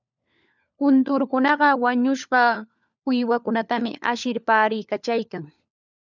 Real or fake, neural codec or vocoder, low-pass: fake; codec, 16 kHz, 4 kbps, FunCodec, trained on LibriTTS, 50 frames a second; 7.2 kHz